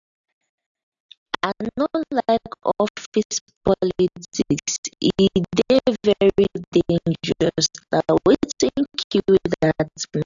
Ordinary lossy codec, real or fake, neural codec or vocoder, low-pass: AAC, 64 kbps; real; none; 7.2 kHz